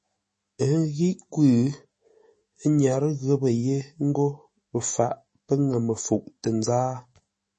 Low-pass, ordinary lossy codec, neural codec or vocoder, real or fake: 10.8 kHz; MP3, 32 kbps; autoencoder, 48 kHz, 128 numbers a frame, DAC-VAE, trained on Japanese speech; fake